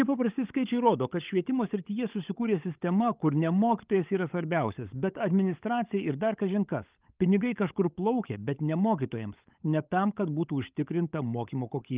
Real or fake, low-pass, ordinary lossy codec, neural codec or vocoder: fake; 3.6 kHz; Opus, 24 kbps; codec, 16 kHz, 16 kbps, FunCodec, trained on LibriTTS, 50 frames a second